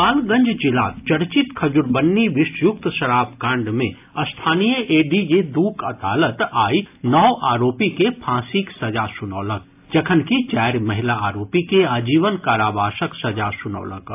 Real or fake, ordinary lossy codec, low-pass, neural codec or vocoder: real; AAC, 32 kbps; 3.6 kHz; none